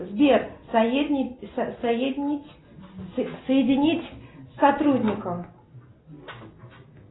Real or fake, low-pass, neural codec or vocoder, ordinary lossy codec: real; 7.2 kHz; none; AAC, 16 kbps